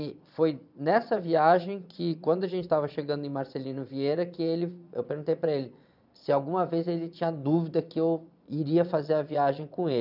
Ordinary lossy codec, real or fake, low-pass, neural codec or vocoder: none; fake; 5.4 kHz; vocoder, 22.05 kHz, 80 mel bands, Vocos